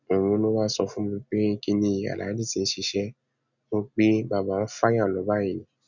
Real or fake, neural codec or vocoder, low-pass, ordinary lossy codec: real; none; 7.2 kHz; none